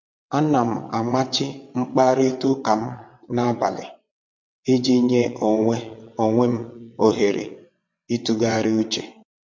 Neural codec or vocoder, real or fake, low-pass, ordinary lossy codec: vocoder, 22.05 kHz, 80 mel bands, WaveNeXt; fake; 7.2 kHz; MP3, 48 kbps